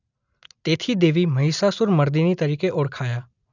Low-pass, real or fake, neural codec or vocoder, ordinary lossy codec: 7.2 kHz; real; none; none